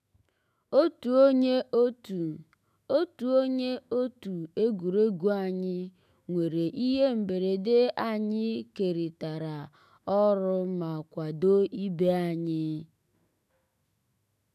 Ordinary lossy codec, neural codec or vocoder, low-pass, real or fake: none; autoencoder, 48 kHz, 128 numbers a frame, DAC-VAE, trained on Japanese speech; 14.4 kHz; fake